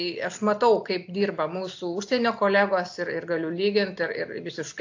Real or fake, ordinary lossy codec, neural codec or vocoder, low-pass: real; AAC, 48 kbps; none; 7.2 kHz